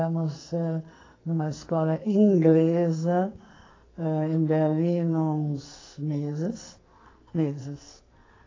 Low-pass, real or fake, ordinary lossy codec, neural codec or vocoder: 7.2 kHz; fake; AAC, 32 kbps; codec, 44.1 kHz, 2.6 kbps, SNAC